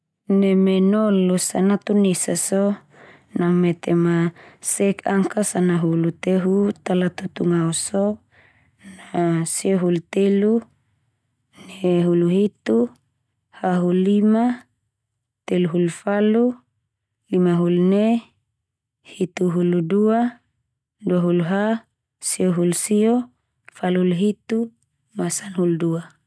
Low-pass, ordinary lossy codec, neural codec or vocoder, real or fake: none; none; none; real